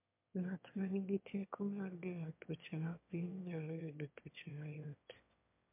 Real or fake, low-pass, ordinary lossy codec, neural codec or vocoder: fake; 3.6 kHz; MP3, 32 kbps; autoencoder, 22.05 kHz, a latent of 192 numbers a frame, VITS, trained on one speaker